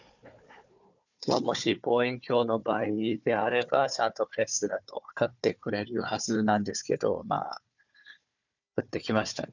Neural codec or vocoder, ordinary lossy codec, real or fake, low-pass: codec, 16 kHz, 4 kbps, FunCodec, trained on Chinese and English, 50 frames a second; none; fake; 7.2 kHz